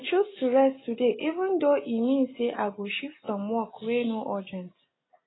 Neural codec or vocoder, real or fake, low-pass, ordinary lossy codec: none; real; 7.2 kHz; AAC, 16 kbps